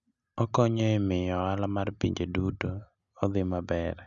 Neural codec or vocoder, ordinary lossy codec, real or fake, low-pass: none; none; real; 7.2 kHz